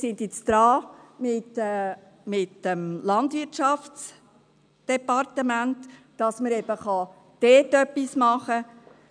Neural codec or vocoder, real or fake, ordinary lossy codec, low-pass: none; real; none; 9.9 kHz